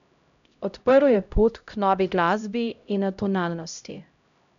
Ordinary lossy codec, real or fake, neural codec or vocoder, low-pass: none; fake; codec, 16 kHz, 0.5 kbps, X-Codec, HuBERT features, trained on LibriSpeech; 7.2 kHz